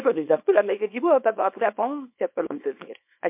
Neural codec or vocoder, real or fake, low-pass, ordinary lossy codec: codec, 24 kHz, 0.9 kbps, WavTokenizer, small release; fake; 3.6 kHz; MP3, 32 kbps